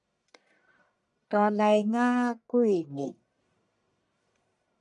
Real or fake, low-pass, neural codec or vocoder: fake; 10.8 kHz; codec, 44.1 kHz, 1.7 kbps, Pupu-Codec